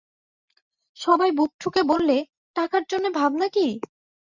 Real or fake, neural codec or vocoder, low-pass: real; none; 7.2 kHz